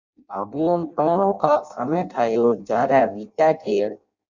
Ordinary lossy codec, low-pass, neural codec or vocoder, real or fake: Opus, 64 kbps; 7.2 kHz; codec, 16 kHz in and 24 kHz out, 0.6 kbps, FireRedTTS-2 codec; fake